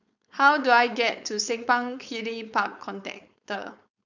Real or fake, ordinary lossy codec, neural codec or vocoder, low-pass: fake; none; codec, 16 kHz, 4.8 kbps, FACodec; 7.2 kHz